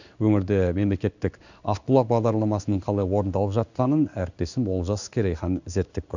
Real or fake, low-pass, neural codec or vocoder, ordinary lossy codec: fake; 7.2 kHz; codec, 16 kHz in and 24 kHz out, 1 kbps, XY-Tokenizer; none